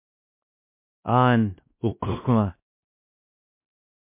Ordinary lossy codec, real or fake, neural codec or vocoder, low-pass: MP3, 32 kbps; fake; codec, 16 kHz, 1 kbps, X-Codec, WavLM features, trained on Multilingual LibriSpeech; 3.6 kHz